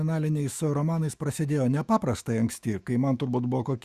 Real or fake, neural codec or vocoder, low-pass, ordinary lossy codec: fake; autoencoder, 48 kHz, 128 numbers a frame, DAC-VAE, trained on Japanese speech; 14.4 kHz; Opus, 64 kbps